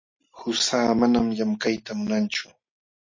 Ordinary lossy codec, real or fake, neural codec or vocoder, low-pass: MP3, 32 kbps; real; none; 7.2 kHz